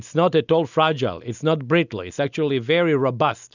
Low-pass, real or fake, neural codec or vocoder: 7.2 kHz; real; none